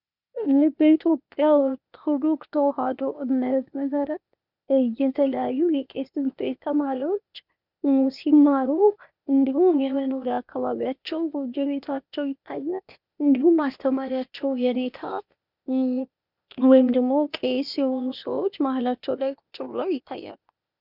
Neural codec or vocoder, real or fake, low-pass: codec, 16 kHz, 0.8 kbps, ZipCodec; fake; 5.4 kHz